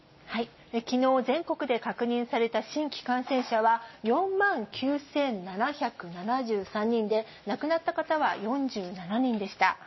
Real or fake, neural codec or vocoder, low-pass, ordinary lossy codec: real; none; 7.2 kHz; MP3, 24 kbps